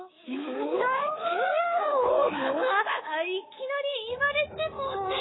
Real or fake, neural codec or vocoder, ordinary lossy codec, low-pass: fake; codec, 24 kHz, 3.1 kbps, DualCodec; AAC, 16 kbps; 7.2 kHz